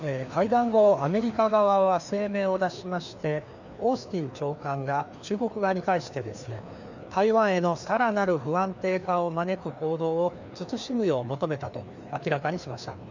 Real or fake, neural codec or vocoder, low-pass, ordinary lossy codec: fake; codec, 16 kHz, 2 kbps, FreqCodec, larger model; 7.2 kHz; none